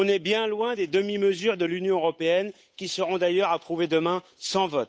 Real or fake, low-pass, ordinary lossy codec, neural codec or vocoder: fake; none; none; codec, 16 kHz, 8 kbps, FunCodec, trained on Chinese and English, 25 frames a second